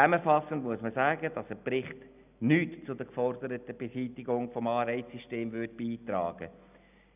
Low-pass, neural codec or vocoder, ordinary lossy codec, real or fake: 3.6 kHz; none; none; real